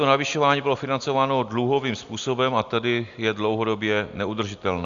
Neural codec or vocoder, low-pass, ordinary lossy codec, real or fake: none; 7.2 kHz; Opus, 64 kbps; real